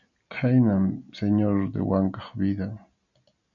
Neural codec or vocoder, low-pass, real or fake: none; 7.2 kHz; real